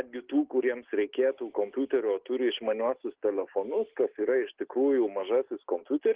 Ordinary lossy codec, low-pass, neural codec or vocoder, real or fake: Opus, 16 kbps; 3.6 kHz; none; real